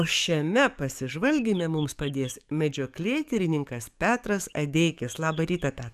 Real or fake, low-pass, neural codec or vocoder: fake; 14.4 kHz; codec, 44.1 kHz, 7.8 kbps, DAC